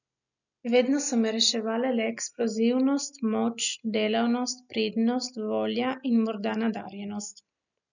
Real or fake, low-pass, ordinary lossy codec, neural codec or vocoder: real; 7.2 kHz; none; none